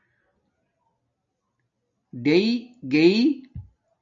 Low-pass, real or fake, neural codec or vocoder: 7.2 kHz; real; none